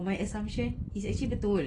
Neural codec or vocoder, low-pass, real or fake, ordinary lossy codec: none; 10.8 kHz; real; AAC, 32 kbps